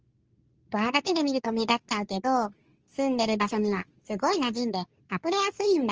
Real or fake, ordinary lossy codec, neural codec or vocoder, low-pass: fake; Opus, 24 kbps; codec, 24 kHz, 0.9 kbps, WavTokenizer, medium speech release version 2; 7.2 kHz